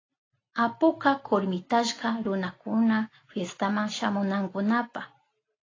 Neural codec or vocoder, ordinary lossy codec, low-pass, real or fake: none; AAC, 32 kbps; 7.2 kHz; real